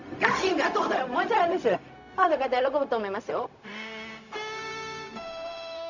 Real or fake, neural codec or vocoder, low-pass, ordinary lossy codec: fake; codec, 16 kHz, 0.4 kbps, LongCat-Audio-Codec; 7.2 kHz; none